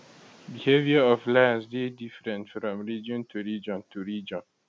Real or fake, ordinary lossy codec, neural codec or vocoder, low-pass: real; none; none; none